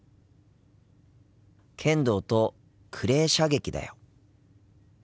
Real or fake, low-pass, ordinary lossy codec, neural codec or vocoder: real; none; none; none